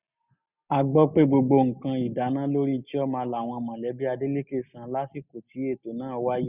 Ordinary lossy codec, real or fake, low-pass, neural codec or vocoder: none; real; 3.6 kHz; none